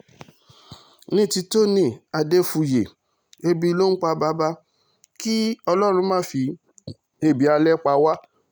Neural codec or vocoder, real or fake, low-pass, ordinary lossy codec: none; real; none; none